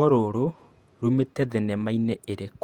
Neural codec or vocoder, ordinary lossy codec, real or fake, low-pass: vocoder, 48 kHz, 128 mel bands, Vocos; Opus, 32 kbps; fake; 19.8 kHz